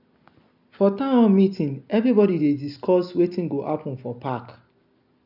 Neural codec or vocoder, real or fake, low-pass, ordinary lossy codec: none; real; 5.4 kHz; none